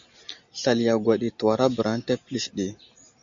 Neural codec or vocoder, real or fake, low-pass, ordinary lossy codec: none; real; 7.2 kHz; AAC, 64 kbps